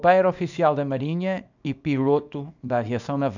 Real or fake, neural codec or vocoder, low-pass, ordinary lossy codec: fake; codec, 24 kHz, 0.9 kbps, WavTokenizer, small release; 7.2 kHz; none